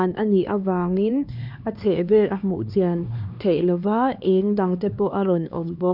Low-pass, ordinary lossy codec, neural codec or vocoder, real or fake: 5.4 kHz; none; codec, 16 kHz, 2 kbps, X-Codec, HuBERT features, trained on LibriSpeech; fake